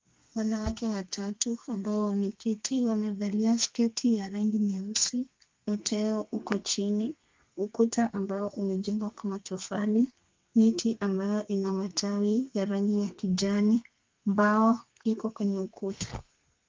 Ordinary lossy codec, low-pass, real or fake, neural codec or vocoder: Opus, 32 kbps; 7.2 kHz; fake; codec, 32 kHz, 1.9 kbps, SNAC